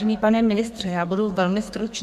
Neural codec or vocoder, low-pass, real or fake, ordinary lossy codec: codec, 32 kHz, 1.9 kbps, SNAC; 14.4 kHz; fake; AAC, 96 kbps